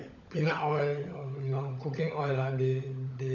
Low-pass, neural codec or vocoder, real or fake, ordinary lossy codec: 7.2 kHz; codec, 16 kHz, 16 kbps, FunCodec, trained on LibriTTS, 50 frames a second; fake; none